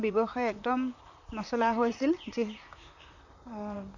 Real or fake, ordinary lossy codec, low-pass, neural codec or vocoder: fake; none; 7.2 kHz; vocoder, 44.1 kHz, 128 mel bands, Pupu-Vocoder